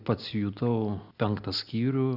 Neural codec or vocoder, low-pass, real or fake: none; 5.4 kHz; real